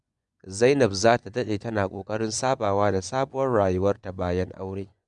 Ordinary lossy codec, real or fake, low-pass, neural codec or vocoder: none; fake; 10.8 kHz; vocoder, 24 kHz, 100 mel bands, Vocos